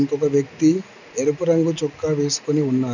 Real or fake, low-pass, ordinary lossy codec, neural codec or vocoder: real; 7.2 kHz; none; none